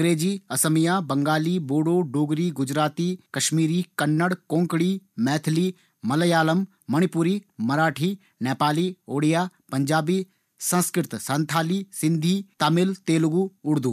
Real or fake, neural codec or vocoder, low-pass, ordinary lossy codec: real; none; 14.4 kHz; none